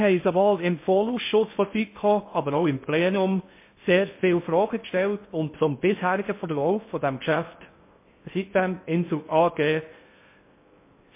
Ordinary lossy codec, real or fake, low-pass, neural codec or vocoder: MP3, 24 kbps; fake; 3.6 kHz; codec, 16 kHz in and 24 kHz out, 0.6 kbps, FocalCodec, streaming, 4096 codes